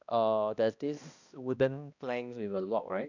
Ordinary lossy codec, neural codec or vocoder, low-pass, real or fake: none; codec, 16 kHz, 1 kbps, X-Codec, HuBERT features, trained on balanced general audio; 7.2 kHz; fake